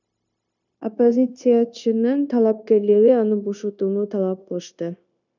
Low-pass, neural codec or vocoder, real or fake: 7.2 kHz; codec, 16 kHz, 0.9 kbps, LongCat-Audio-Codec; fake